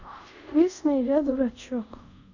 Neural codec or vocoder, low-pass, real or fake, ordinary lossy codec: codec, 24 kHz, 0.5 kbps, DualCodec; 7.2 kHz; fake; none